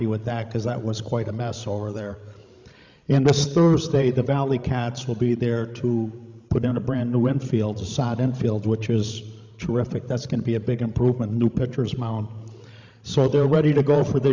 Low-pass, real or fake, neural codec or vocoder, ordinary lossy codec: 7.2 kHz; fake; codec, 16 kHz, 16 kbps, FreqCodec, larger model; MP3, 64 kbps